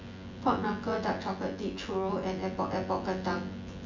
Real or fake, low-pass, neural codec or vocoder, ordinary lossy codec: fake; 7.2 kHz; vocoder, 24 kHz, 100 mel bands, Vocos; MP3, 64 kbps